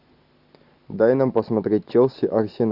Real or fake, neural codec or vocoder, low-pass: real; none; 5.4 kHz